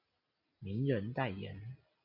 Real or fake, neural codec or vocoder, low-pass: real; none; 5.4 kHz